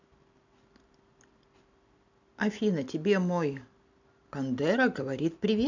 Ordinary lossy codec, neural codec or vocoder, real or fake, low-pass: none; none; real; 7.2 kHz